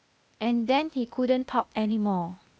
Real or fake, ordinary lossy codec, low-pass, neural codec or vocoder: fake; none; none; codec, 16 kHz, 0.8 kbps, ZipCodec